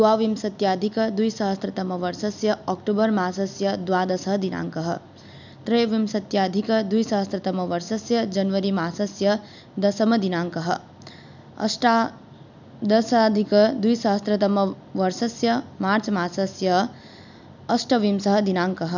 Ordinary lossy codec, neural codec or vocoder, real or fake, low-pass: none; none; real; 7.2 kHz